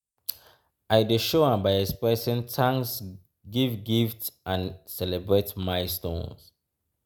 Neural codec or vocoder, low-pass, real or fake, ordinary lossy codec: none; none; real; none